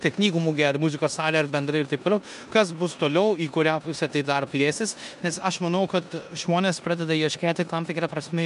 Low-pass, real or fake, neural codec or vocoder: 10.8 kHz; fake; codec, 16 kHz in and 24 kHz out, 0.9 kbps, LongCat-Audio-Codec, four codebook decoder